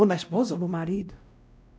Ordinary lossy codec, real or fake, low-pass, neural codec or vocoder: none; fake; none; codec, 16 kHz, 0.5 kbps, X-Codec, WavLM features, trained on Multilingual LibriSpeech